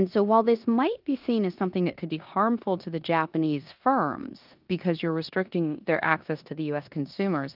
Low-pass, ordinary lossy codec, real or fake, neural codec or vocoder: 5.4 kHz; Opus, 24 kbps; fake; codec, 16 kHz in and 24 kHz out, 0.9 kbps, LongCat-Audio-Codec, four codebook decoder